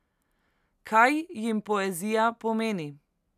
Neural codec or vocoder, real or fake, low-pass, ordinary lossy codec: none; real; 14.4 kHz; none